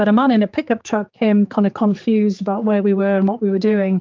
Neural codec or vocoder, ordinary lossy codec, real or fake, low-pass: codec, 16 kHz, 4 kbps, X-Codec, HuBERT features, trained on general audio; Opus, 24 kbps; fake; 7.2 kHz